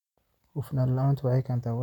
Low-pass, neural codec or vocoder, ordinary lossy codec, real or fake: 19.8 kHz; none; none; real